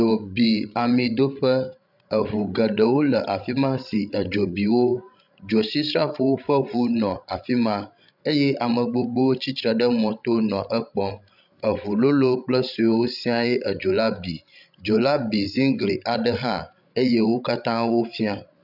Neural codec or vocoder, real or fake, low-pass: codec, 16 kHz, 16 kbps, FreqCodec, larger model; fake; 5.4 kHz